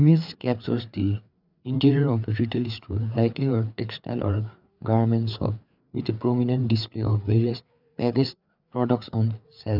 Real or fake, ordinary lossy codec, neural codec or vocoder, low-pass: fake; none; codec, 16 kHz, 4 kbps, FreqCodec, larger model; 5.4 kHz